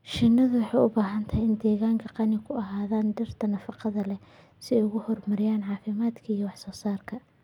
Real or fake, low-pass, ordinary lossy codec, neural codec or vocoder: real; 19.8 kHz; none; none